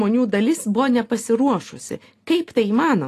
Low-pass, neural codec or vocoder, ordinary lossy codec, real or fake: 14.4 kHz; none; AAC, 48 kbps; real